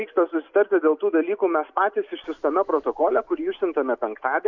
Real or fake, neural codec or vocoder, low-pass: real; none; 7.2 kHz